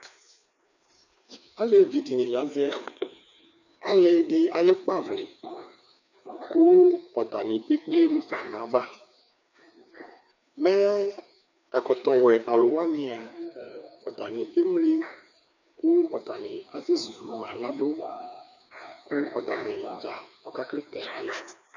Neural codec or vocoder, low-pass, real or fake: codec, 16 kHz, 2 kbps, FreqCodec, larger model; 7.2 kHz; fake